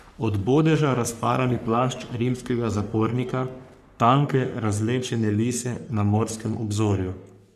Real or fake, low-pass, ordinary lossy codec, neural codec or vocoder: fake; 14.4 kHz; none; codec, 44.1 kHz, 3.4 kbps, Pupu-Codec